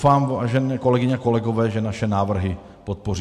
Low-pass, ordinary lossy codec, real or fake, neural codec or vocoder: 14.4 kHz; MP3, 64 kbps; real; none